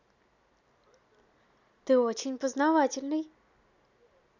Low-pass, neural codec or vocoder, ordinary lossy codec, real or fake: 7.2 kHz; none; none; real